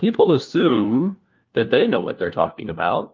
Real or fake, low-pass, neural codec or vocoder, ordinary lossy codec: fake; 7.2 kHz; codec, 16 kHz, 1 kbps, FunCodec, trained on LibriTTS, 50 frames a second; Opus, 24 kbps